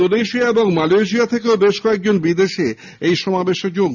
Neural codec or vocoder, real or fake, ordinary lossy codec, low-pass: none; real; none; 7.2 kHz